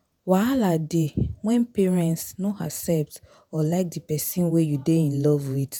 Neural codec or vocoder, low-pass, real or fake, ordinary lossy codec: vocoder, 48 kHz, 128 mel bands, Vocos; none; fake; none